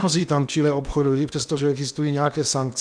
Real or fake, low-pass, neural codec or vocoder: fake; 9.9 kHz; codec, 16 kHz in and 24 kHz out, 0.8 kbps, FocalCodec, streaming, 65536 codes